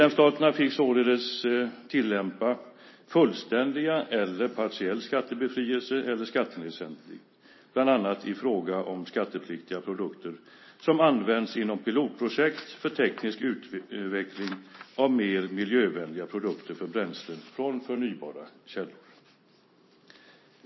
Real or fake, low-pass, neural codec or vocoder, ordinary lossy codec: real; 7.2 kHz; none; MP3, 24 kbps